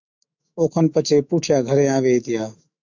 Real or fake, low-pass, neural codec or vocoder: fake; 7.2 kHz; autoencoder, 48 kHz, 128 numbers a frame, DAC-VAE, trained on Japanese speech